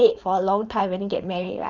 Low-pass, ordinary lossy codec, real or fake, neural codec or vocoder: 7.2 kHz; none; fake; codec, 16 kHz, 4.8 kbps, FACodec